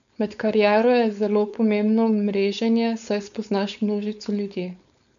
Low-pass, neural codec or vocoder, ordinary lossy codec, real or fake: 7.2 kHz; codec, 16 kHz, 4.8 kbps, FACodec; none; fake